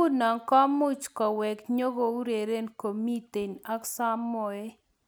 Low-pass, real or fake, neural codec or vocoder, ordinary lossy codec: none; real; none; none